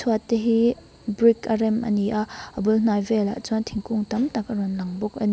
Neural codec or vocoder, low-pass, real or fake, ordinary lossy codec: none; none; real; none